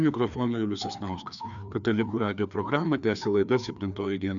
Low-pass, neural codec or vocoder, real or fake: 7.2 kHz; codec, 16 kHz, 2 kbps, FreqCodec, larger model; fake